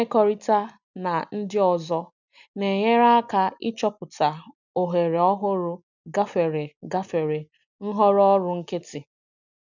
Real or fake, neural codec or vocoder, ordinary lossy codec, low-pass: real; none; none; 7.2 kHz